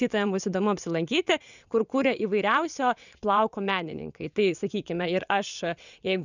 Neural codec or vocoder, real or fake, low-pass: vocoder, 22.05 kHz, 80 mel bands, WaveNeXt; fake; 7.2 kHz